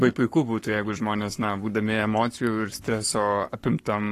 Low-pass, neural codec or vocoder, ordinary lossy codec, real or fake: 14.4 kHz; codec, 44.1 kHz, 7.8 kbps, Pupu-Codec; AAC, 48 kbps; fake